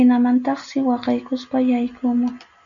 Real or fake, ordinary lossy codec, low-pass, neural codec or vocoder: real; MP3, 96 kbps; 7.2 kHz; none